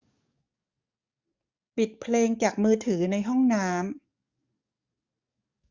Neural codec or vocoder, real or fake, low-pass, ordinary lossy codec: codec, 44.1 kHz, 7.8 kbps, DAC; fake; 7.2 kHz; Opus, 64 kbps